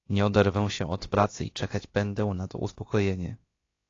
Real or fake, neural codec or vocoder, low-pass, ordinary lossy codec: fake; codec, 16 kHz, about 1 kbps, DyCAST, with the encoder's durations; 7.2 kHz; AAC, 32 kbps